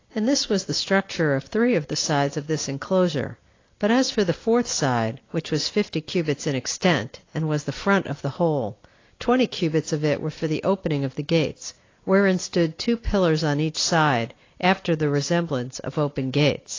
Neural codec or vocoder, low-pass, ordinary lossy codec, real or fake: none; 7.2 kHz; AAC, 32 kbps; real